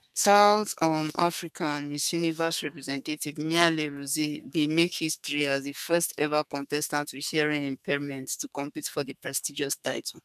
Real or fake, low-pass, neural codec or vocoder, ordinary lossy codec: fake; 14.4 kHz; codec, 32 kHz, 1.9 kbps, SNAC; none